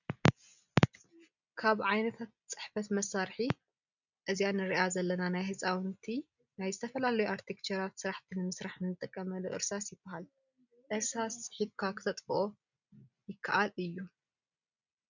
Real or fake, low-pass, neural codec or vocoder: real; 7.2 kHz; none